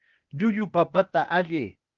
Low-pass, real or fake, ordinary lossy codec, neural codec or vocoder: 7.2 kHz; fake; Opus, 32 kbps; codec, 16 kHz, 0.8 kbps, ZipCodec